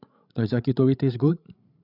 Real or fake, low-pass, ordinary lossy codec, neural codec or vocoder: fake; 5.4 kHz; none; codec, 16 kHz, 16 kbps, FreqCodec, larger model